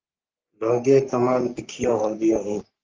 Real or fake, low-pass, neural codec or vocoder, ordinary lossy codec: fake; 7.2 kHz; codec, 44.1 kHz, 3.4 kbps, Pupu-Codec; Opus, 24 kbps